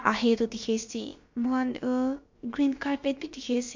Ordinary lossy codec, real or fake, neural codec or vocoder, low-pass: MP3, 48 kbps; fake; codec, 16 kHz, about 1 kbps, DyCAST, with the encoder's durations; 7.2 kHz